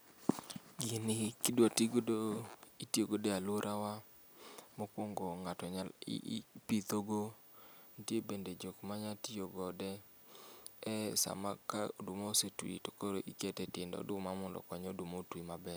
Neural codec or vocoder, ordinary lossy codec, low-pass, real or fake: none; none; none; real